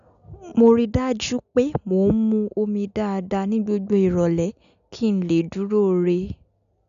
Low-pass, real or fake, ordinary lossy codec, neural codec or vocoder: 7.2 kHz; real; none; none